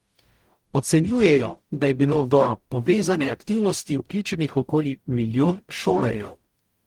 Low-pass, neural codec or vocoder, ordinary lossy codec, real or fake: 19.8 kHz; codec, 44.1 kHz, 0.9 kbps, DAC; Opus, 24 kbps; fake